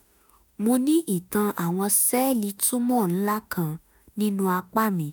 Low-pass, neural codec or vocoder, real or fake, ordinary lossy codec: none; autoencoder, 48 kHz, 32 numbers a frame, DAC-VAE, trained on Japanese speech; fake; none